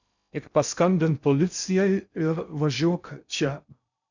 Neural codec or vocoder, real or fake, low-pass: codec, 16 kHz in and 24 kHz out, 0.6 kbps, FocalCodec, streaming, 2048 codes; fake; 7.2 kHz